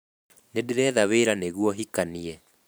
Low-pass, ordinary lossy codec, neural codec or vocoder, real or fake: none; none; vocoder, 44.1 kHz, 128 mel bands every 512 samples, BigVGAN v2; fake